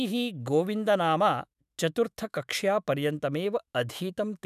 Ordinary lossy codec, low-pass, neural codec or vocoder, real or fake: none; 14.4 kHz; autoencoder, 48 kHz, 128 numbers a frame, DAC-VAE, trained on Japanese speech; fake